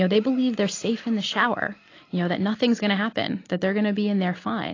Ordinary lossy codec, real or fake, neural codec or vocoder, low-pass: AAC, 32 kbps; real; none; 7.2 kHz